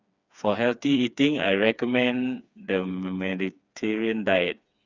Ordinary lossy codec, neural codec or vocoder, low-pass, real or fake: Opus, 64 kbps; codec, 16 kHz, 4 kbps, FreqCodec, smaller model; 7.2 kHz; fake